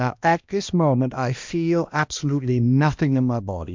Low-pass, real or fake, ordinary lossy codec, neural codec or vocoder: 7.2 kHz; fake; MP3, 64 kbps; codec, 16 kHz, 1 kbps, X-Codec, HuBERT features, trained on balanced general audio